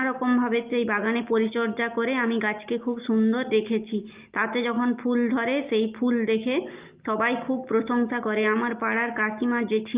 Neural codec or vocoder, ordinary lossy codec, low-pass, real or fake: none; Opus, 32 kbps; 3.6 kHz; real